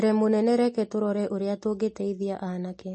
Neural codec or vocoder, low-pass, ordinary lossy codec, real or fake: none; 10.8 kHz; MP3, 32 kbps; real